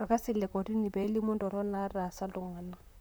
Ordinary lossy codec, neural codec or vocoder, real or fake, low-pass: none; vocoder, 44.1 kHz, 128 mel bands, Pupu-Vocoder; fake; none